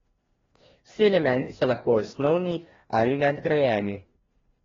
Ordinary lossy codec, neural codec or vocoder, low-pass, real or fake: AAC, 24 kbps; codec, 16 kHz, 1 kbps, FreqCodec, larger model; 7.2 kHz; fake